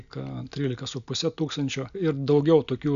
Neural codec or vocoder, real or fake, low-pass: none; real; 7.2 kHz